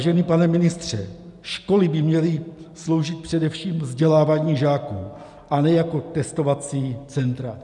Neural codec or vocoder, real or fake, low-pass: none; real; 10.8 kHz